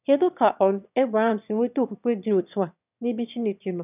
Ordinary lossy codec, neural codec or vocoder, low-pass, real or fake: none; autoencoder, 22.05 kHz, a latent of 192 numbers a frame, VITS, trained on one speaker; 3.6 kHz; fake